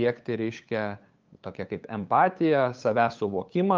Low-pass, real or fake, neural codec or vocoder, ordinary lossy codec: 7.2 kHz; fake; codec, 16 kHz, 8 kbps, FunCodec, trained on LibriTTS, 25 frames a second; Opus, 24 kbps